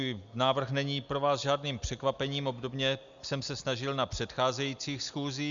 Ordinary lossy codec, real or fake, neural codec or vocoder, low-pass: Opus, 64 kbps; real; none; 7.2 kHz